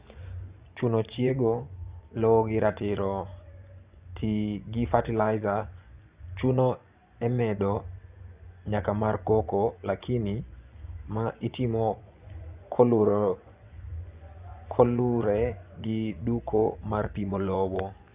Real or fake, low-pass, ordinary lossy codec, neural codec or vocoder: fake; 3.6 kHz; Opus, 64 kbps; vocoder, 24 kHz, 100 mel bands, Vocos